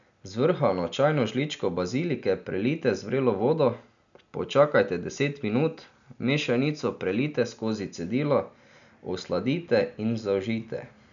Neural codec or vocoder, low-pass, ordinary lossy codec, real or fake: none; 7.2 kHz; none; real